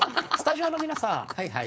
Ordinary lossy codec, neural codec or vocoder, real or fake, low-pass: none; codec, 16 kHz, 4.8 kbps, FACodec; fake; none